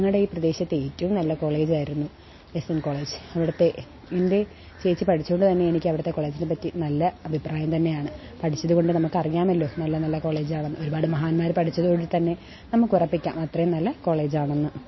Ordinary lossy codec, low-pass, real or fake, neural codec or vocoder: MP3, 24 kbps; 7.2 kHz; real; none